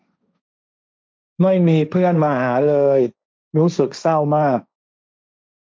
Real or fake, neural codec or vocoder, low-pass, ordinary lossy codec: fake; codec, 16 kHz, 1.1 kbps, Voila-Tokenizer; none; none